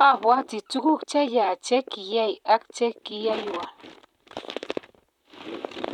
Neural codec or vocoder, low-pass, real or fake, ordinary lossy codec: vocoder, 44.1 kHz, 128 mel bands, Pupu-Vocoder; 19.8 kHz; fake; none